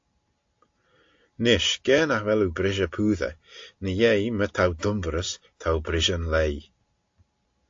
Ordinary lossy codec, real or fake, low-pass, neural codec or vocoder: AAC, 48 kbps; real; 7.2 kHz; none